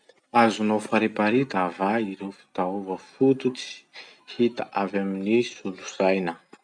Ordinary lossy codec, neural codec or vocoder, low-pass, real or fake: none; none; 9.9 kHz; real